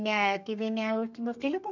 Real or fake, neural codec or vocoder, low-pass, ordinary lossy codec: fake; codec, 32 kHz, 1.9 kbps, SNAC; 7.2 kHz; none